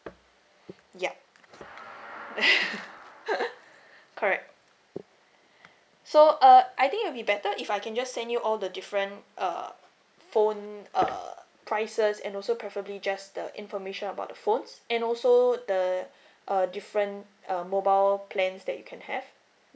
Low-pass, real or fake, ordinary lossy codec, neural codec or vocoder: none; real; none; none